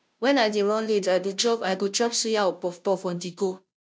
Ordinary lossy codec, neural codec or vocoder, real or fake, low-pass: none; codec, 16 kHz, 0.5 kbps, FunCodec, trained on Chinese and English, 25 frames a second; fake; none